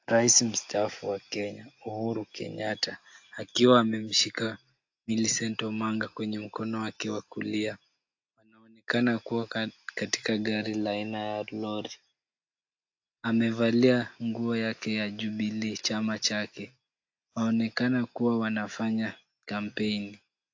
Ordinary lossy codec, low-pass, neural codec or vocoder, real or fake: AAC, 48 kbps; 7.2 kHz; none; real